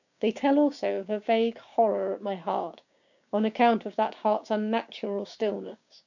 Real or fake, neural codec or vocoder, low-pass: fake; codec, 16 kHz, 6 kbps, DAC; 7.2 kHz